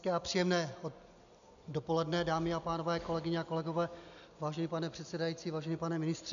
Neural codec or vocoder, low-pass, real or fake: none; 7.2 kHz; real